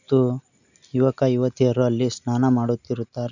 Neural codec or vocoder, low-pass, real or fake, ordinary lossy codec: none; 7.2 kHz; real; none